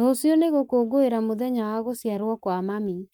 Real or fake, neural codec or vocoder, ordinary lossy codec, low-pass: fake; codec, 44.1 kHz, 7.8 kbps, Pupu-Codec; none; 19.8 kHz